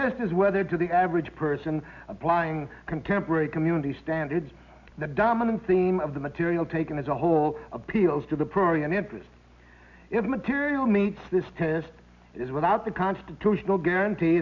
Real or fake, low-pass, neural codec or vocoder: real; 7.2 kHz; none